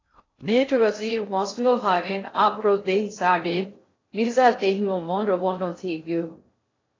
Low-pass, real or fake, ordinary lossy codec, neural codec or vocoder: 7.2 kHz; fake; AAC, 32 kbps; codec, 16 kHz in and 24 kHz out, 0.6 kbps, FocalCodec, streaming, 2048 codes